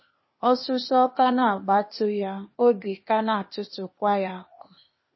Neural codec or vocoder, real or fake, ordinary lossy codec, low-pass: codec, 16 kHz, 0.8 kbps, ZipCodec; fake; MP3, 24 kbps; 7.2 kHz